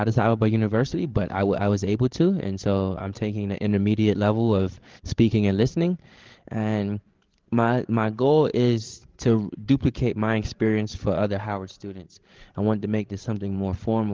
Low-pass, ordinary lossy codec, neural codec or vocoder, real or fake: 7.2 kHz; Opus, 16 kbps; codec, 16 kHz, 16 kbps, FreqCodec, larger model; fake